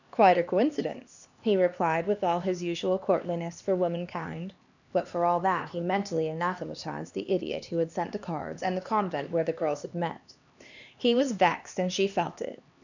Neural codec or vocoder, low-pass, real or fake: codec, 16 kHz, 2 kbps, X-Codec, HuBERT features, trained on LibriSpeech; 7.2 kHz; fake